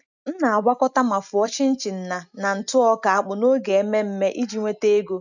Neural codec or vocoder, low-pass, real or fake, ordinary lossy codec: none; 7.2 kHz; real; none